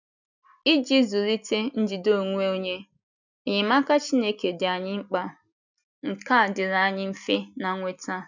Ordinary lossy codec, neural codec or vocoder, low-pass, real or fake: none; none; 7.2 kHz; real